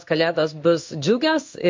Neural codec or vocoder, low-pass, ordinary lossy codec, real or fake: codec, 16 kHz in and 24 kHz out, 1 kbps, XY-Tokenizer; 7.2 kHz; MP3, 48 kbps; fake